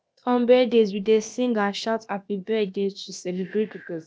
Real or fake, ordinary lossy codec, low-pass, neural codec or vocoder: fake; none; none; codec, 16 kHz, about 1 kbps, DyCAST, with the encoder's durations